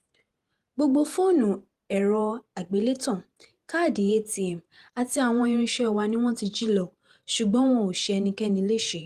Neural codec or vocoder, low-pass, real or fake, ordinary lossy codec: vocoder, 48 kHz, 128 mel bands, Vocos; 14.4 kHz; fake; Opus, 24 kbps